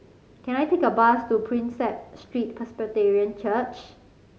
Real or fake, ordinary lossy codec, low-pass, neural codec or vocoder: real; none; none; none